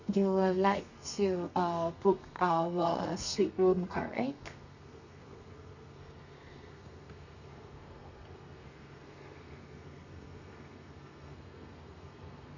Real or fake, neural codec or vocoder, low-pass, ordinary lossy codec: fake; codec, 32 kHz, 1.9 kbps, SNAC; 7.2 kHz; none